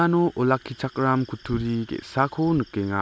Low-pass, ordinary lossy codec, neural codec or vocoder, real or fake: none; none; none; real